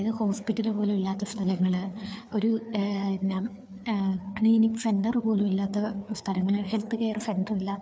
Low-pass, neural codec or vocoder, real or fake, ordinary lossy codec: none; codec, 16 kHz, 4 kbps, FreqCodec, larger model; fake; none